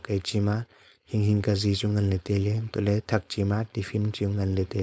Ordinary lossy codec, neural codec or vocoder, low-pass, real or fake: none; codec, 16 kHz, 4.8 kbps, FACodec; none; fake